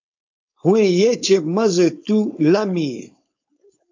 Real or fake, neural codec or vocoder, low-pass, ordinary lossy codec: fake; codec, 16 kHz, 4.8 kbps, FACodec; 7.2 kHz; AAC, 48 kbps